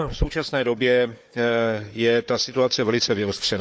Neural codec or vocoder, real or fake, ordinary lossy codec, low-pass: codec, 16 kHz, 4 kbps, FunCodec, trained on Chinese and English, 50 frames a second; fake; none; none